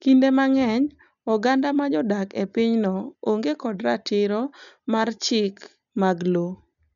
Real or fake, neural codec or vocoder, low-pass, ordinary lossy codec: real; none; 7.2 kHz; MP3, 96 kbps